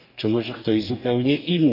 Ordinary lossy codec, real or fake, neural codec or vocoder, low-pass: none; fake; codec, 44.1 kHz, 2.6 kbps, DAC; 5.4 kHz